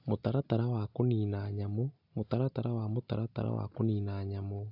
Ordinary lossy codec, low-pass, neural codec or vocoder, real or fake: AAC, 48 kbps; 5.4 kHz; none; real